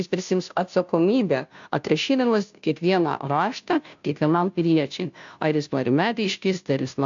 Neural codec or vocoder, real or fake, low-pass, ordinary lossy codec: codec, 16 kHz, 0.5 kbps, FunCodec, trained on Chinese and English, 25 frames a second; fake; 7.2 kHz; AAC, 64 kbps